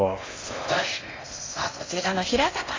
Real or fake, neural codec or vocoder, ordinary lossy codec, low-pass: fake; codec, 16 kHz in and 24 kHz out, 0.6 kbps, FocalCodec, streaming, 2048 codes; AAC, 32 kbps; 7.2 kHz